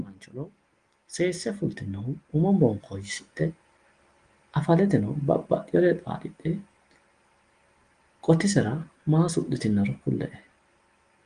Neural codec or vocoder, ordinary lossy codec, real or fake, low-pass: none; Opus, 24 kbps; real; 9.9 kHz